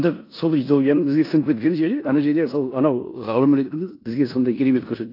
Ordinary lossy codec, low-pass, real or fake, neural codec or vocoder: AAC, 32 kbps; 5.4 kHz; fake; codec, 16 kHz in and 24 kHz out, 0.9 kbps, LongCat-Audio-Codec, four codebook decoder